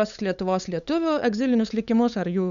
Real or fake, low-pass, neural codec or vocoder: fake; 7.2 kHz; codec, 16 kHz, 8 kbps, FunCodec, trained on LibriTTS, 25 frames a second